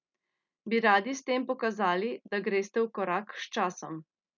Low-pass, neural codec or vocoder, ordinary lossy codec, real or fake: 7.2 kHz; none; none; real